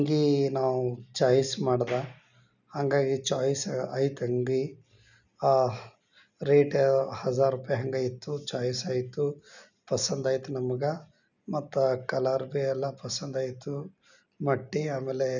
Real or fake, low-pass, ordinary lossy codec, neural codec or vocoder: real; 7.2 kHz; none; none